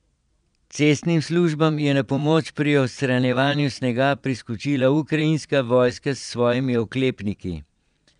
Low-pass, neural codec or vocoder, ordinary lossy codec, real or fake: 9.9 kHz; vocoder, 22.05 kHz, 80 mel bands, Vocos; none; fake